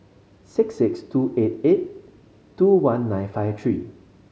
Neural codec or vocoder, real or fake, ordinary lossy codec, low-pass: none; real; none; none